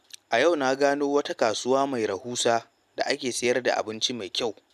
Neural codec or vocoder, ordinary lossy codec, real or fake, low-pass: none; none; real; 14.4 kHz